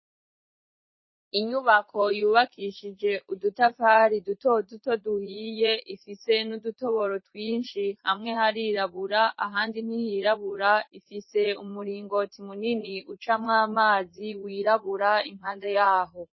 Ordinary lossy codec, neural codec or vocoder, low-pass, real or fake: MP3, 24 kbps; vocoder, 44.1 kHz, 80 mel bands, Vocos; 7.2 kHz; fake